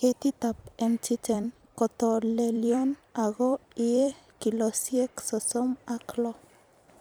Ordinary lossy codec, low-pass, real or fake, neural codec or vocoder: none; none; fake; vocoder, 44.1 kHz, 128 mel bands every 256 samples, BigVGAN v2